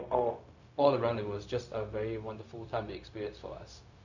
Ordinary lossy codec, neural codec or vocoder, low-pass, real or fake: none; codec, 16 kHz, 0.4 kbps, LongCat-Audio-Codec; 7.2 kHz; fake